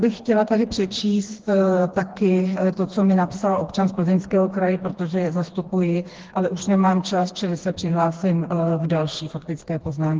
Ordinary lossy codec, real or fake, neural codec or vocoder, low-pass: Opus, 16 kbps; fake; codec, 16 kHz, 2 kbps, FreqCodec, smaller model; 7.2 kHz